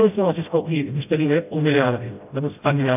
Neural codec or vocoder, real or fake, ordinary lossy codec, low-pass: codec, 16 kHz, 0.5 kbps, FreqCodec, smaller model; fake; AAC, 32 kbps; 3.6 kHz